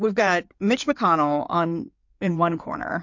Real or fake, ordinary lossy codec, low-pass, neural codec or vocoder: fake; MP3, 48 kbps; 7.2 kHz; codec, 16 kHz in and 24 kHz out, 2.2 kbps, FireRedTTS-2 codec